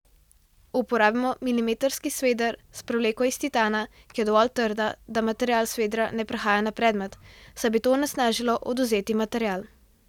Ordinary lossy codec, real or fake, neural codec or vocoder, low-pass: none; real; none; 19.8 kHz